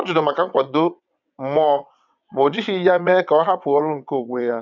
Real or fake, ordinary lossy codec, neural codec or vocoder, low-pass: fake; none; vocoder, 22.05 kHz, 80 mel bands, WaveNeXt; 7.2 kHz